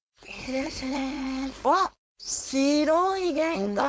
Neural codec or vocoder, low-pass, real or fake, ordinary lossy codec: codec, 16 kHz, 4.8 kbps, FACodec; none; fake; none